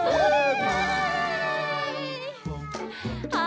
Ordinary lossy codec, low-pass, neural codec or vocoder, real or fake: none; none; none; real